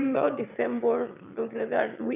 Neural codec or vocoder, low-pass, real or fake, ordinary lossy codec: autoencoder, 22.05 kHz, a latent of 192 numbers a frame, VITS, trained on one speaker; 3.6 kHz; fake; none